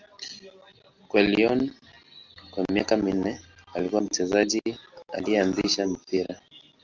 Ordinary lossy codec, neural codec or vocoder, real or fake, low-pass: Opus, 32 kbps; none; real; 7.2 kHz